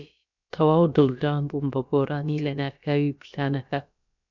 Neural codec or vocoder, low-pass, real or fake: codec, 16 kHz, about 1 kbps, DyCAST, with the encoder's durations; 7.2 kHz; fake